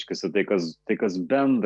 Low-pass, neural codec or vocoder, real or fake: 10.8 kHz; none; real